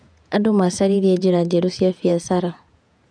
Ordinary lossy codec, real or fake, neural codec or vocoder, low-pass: none; fake; vocoder, 48 kHz, 128 mel bands, Vocos; 9.9 kHz